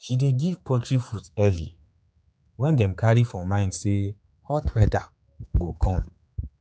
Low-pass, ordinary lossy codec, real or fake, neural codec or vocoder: none; none; fake; codec, 16 kHz, 4 kbps, X-Codec, HuBERT features, trained on general audio